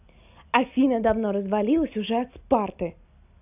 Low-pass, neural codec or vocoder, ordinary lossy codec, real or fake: 3.6 kHz; none; none; real